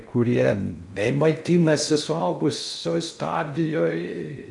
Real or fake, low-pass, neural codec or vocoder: fake; 10.8 kHz; codec, 16 kHz in and 24 kHz out, 0.6 kbps, FocalCodec, streaming, 4096 codes